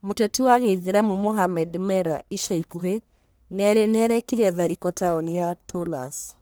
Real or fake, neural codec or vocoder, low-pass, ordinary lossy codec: fake; codec, 44.1 kHz, 1.7 kbps, Pupu-Codec; none; none